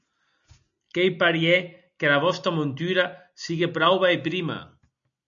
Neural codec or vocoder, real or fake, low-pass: none; real; 7.2 kHz